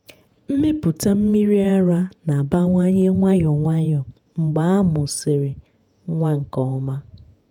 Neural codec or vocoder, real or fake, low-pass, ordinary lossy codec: vocoder, 48 kHz, 128 mel bands, Vocos; fake; 19.8 kHz; none